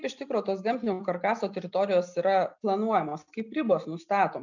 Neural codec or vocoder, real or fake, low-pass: none; real; 7.2 kHz